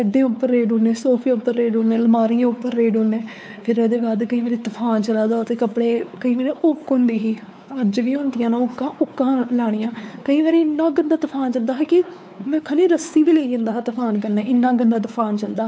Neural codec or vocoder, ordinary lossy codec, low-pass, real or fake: codec, 16 kHz, 4 kbps, X-Codec, WavLM features, trained on Multilingual LibriSpeech; none; none; fake